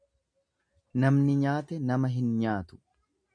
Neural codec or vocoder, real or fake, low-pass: none; real; 9.9 kHz